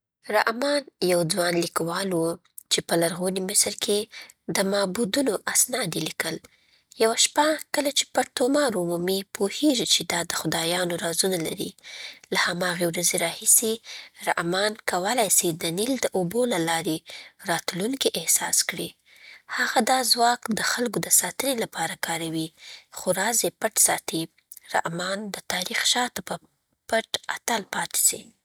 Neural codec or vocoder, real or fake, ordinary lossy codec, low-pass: none; real; none; none